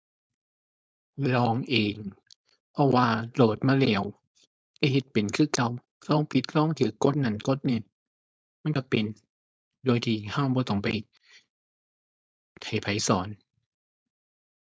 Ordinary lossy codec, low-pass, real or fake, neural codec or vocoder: none; none; fake; codec, 16 kHz, 4.8 kbps, FACodec